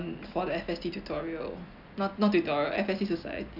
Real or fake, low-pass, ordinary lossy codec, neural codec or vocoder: real; 5.4 kHz; none; none